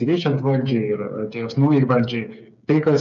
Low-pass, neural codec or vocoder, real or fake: 7.2 kHz; codec, 16 kHz, 8 kbps, FreqCodec, smaller model; fake